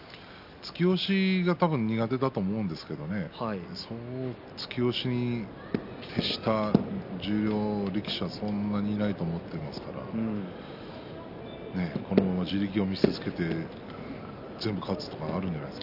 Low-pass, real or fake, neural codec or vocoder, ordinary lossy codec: 5.4 kHz; real; none; none